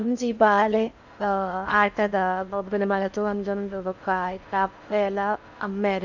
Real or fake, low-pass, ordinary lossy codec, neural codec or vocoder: fake; 7.2 kHz; none; codec, 16 kHz in and 24 kHz out, 0.6 kbps, FocalCodec, streaming, 4096 codes